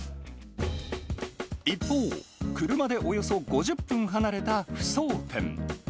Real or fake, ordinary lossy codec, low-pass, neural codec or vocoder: real; none; none; none